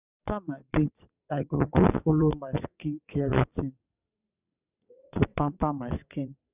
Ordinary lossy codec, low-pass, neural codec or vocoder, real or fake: none; 3.6 kHz; vocoder, 22.05 kHz, 80 mel bands, WaveNeXt; fake